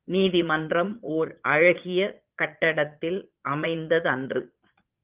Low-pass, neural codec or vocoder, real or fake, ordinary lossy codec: 3.6 kHz; vocoder, 22.05 kHz, 80 mel bands, Vocos; fake; Opus, 32 kbps